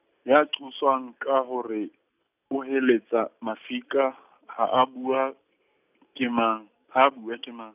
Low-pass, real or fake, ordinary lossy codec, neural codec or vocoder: 3.6 kHz; real; none; none